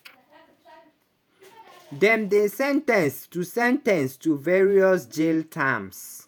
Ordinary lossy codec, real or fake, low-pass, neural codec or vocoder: none; fake; none; vocoder, 48 kHz, 128 mel bands, Vocos